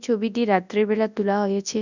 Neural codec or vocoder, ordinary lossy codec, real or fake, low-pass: codec, 24 kHz, 0.9 kbps, WavTokenizer, large speech release; none; fake; 7.2 kHz